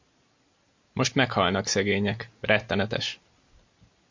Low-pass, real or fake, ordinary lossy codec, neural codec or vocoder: 7.2 kHz; real; MP3, 48 kbps; none